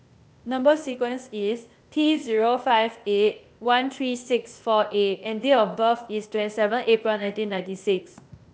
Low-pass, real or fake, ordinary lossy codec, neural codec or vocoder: none; fake; none; codec, 16 kHz, 0.8 kbps, ZipCodec